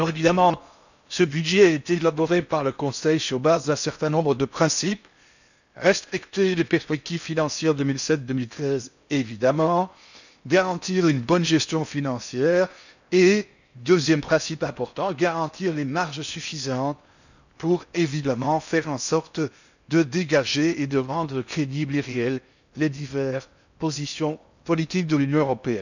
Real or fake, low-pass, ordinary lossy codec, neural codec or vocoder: fake; 7.2 kHz; none; codec, 16 kHz in and 24 kHz out, 0.6 kbps, FocalCodec, streaming, 4096 codes